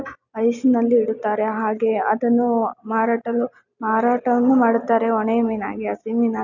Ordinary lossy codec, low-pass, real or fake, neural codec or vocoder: none; 7.2 kHz; real; none